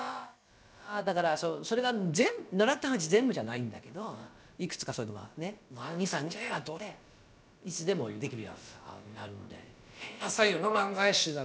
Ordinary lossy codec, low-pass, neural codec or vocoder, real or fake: none; none; codec, 16 kHz, about 1 kbps, DyCAST, with the encoder's durations; fake